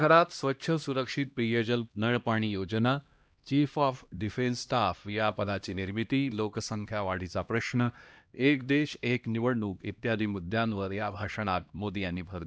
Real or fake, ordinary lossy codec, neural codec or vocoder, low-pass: fake; none; codec, 16 kHz, 1 kbps, X-Codec, HuBERT features, trained on LibriSpeech; none